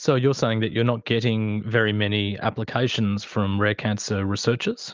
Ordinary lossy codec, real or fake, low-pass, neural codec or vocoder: Opus, 24 kbps; real; 7.2 kHz; none